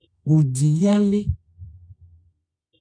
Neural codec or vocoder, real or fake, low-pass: codec, 24 kHz, 0.9 kbps, WavTokenizer, medium music audio release; fake; 9.9 kHz